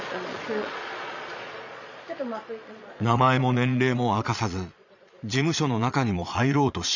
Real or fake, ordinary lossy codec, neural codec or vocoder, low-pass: fake; none; vocoder, 22.05 kHz, 80 mel bands, Vocos; 7.2 kHz